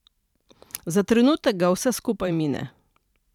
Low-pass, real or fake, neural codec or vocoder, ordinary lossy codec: 19.8 kHz; fake; vocoder, 44.1 kHz, 128 mel bands every 512 samples, BigVGAN v2; none